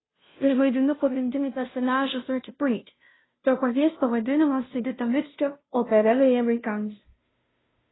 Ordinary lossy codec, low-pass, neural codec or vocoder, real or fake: AAC, 16 kbps; 7.2 kHz; codec, 16 kHz, 0.5 kbps, FunCodec, trained on Chinese and English, 25 frames a second; fake